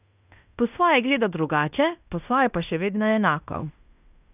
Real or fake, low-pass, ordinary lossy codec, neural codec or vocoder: fake; 3.6 kHz; none; codec, 16 kHz in and 24 kHz out, 0.9 kbps, LongCat-Audio-Codec, fine tuned four codebook decoder